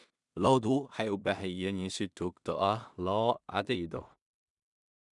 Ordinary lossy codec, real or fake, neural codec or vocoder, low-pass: none; fake; codec, 16 kHz in and 24 kHz out, 0.4 kbps, LongCat-Audio-Codec, two codebook decoder; 10.8 kHz